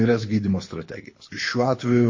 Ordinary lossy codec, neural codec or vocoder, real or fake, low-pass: MP3, 32 kbps; codec, 24 kHz, 6 kbps, HILCodec; fake; 7.2 kHz